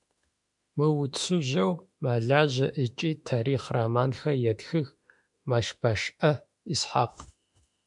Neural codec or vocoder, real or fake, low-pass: autoencoder, 48 kHz, 32 numbers a frame, DAC-VAE, trained on Japanese speech; fake; 10.8 kHz